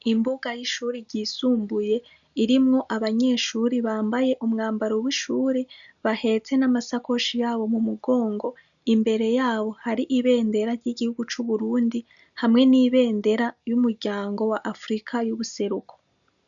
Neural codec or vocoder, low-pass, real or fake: none; 7.2 kHz; real